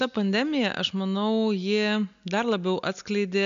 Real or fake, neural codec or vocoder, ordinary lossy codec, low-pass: real; none; AAC, 96 kbps; 7.2 kHz